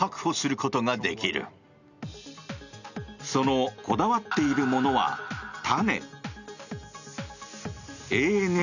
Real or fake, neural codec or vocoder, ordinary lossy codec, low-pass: real; none; none; 7.2 kHz